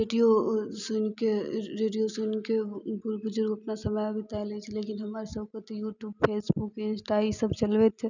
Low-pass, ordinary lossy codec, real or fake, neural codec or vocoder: 7.2 kHz; none; real; none